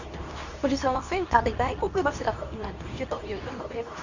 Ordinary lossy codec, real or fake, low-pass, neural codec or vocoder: Opus, 64 kbps; fake; 7.2 kHz; codec, 24 kHz, 0.9 kbps, WavTokenizer, medium speech release version 2